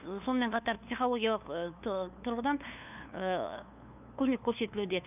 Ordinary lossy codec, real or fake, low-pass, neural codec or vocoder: none; fake; 3.6 kHz; codec, 16 kHz, 2 kbps, FunCodec, trained on LibriTTS, 25 frames a second